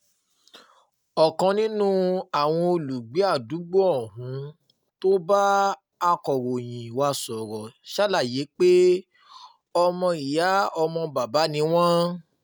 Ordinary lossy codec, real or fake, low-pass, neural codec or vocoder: none; real; none; none